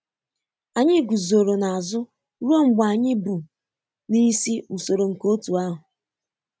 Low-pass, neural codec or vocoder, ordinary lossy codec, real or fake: none; none; none; real